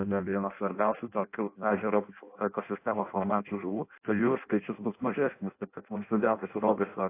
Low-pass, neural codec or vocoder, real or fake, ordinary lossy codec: 3.6 kHz; codec, 16 kHz in and 24 kHz out, 0.6 kbps, FireRedTTS-2 codec; fake; AAC, 24 kbps